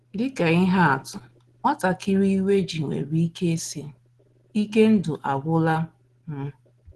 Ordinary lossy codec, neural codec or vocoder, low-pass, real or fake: Opus, 16 kbps; none; 10.8 kHz; real